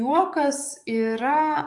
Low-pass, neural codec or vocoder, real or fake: 10.8 kHz; codec, 44.1 kHz, 7.8 kbps, DAC; fake